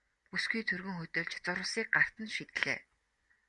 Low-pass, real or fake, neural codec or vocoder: 10.8 kHz; fake; vocoder, 24 kHz, 100 mel bands, Vocos